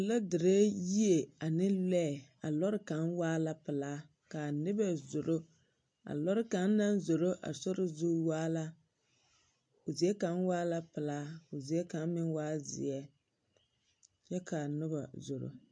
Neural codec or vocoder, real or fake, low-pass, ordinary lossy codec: none; real; 9.9 kHz; MP3, 48 kbps